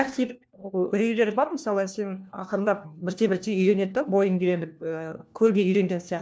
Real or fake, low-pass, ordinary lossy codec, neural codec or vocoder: fake; none; none; codec, 16 kHz, 1 kbps, FunCodec, trained on LibriTTS, 50 frames a second